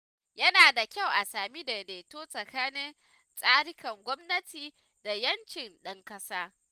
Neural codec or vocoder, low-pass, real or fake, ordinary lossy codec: none; 14.4 kHz; real; Opus, 32 kbps